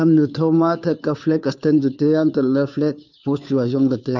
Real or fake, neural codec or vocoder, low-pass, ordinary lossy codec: fake; codec, 16 kHz, 2 kbps, FunCodec, trained on Chinese and English, 25 frames a second; 7.2 kHz; none